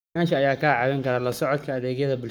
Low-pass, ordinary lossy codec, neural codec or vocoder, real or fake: none; none; codec, 44.1 kHz, 7.8 kbps, Pupu-Codec; fake